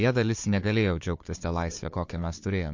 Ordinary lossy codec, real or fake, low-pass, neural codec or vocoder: MP3, 48 kbps; fake; 7.2 kHz; codec, 16 kHz, 4 kbps, FunCodec, trained on Chinese and English, 50 frames a second